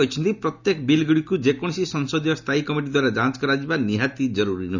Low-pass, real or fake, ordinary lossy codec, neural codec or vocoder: 7.2 kHz; real; none; none